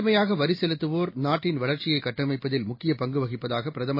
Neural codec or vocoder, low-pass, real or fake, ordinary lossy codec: none; 5.4 kHz; real; MP3, 24 kbps